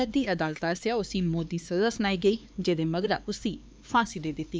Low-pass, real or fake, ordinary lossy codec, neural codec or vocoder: none; fake; none; codec, 16 kHz, 4 kbps, X-Codec, HuBERT features, trained on LibriSpeech